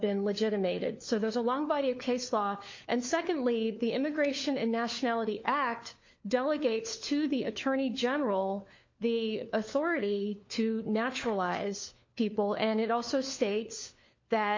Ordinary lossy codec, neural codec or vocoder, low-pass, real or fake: MP3, 48 kbps; codec, 16 kHz, 4 kbps, FunCodec, trained on LibriTTS, 50 frames a second; 7.2 kHz; fake